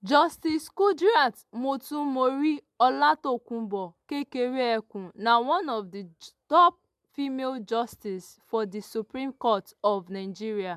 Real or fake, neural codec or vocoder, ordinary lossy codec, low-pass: real; none; MP3, 96 kbps; 14.4 kHz